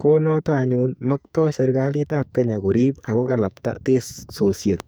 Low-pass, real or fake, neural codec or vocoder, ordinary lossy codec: none; fake; codec, 44.1 kHz, 2.6 kbps, SNAC; none